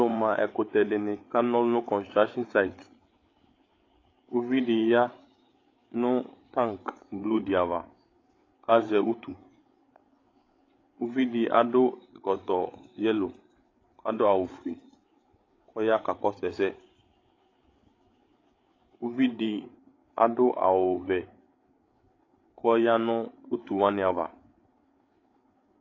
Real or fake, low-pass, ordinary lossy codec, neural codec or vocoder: fake; 7.2 kHz; AAC, 32 kbps; codec, 16 kHz, 8 kbps, FreqCodec, larger model